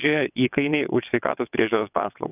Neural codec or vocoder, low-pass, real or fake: vocoder, 22.05 kHz, 80 mel bands, WaveNeXt; 3.6 kHz; fake